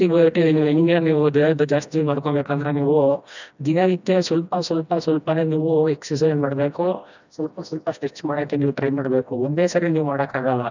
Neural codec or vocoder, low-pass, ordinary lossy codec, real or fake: codec, 16 kHz, 1 kbps, FreqCodec, smaller model; 7.2 kHz; none; fake